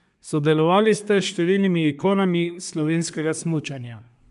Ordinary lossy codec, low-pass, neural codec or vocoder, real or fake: none; 10.8 kHz; codec, 24 kHz, 1 kbps, SNAC; fake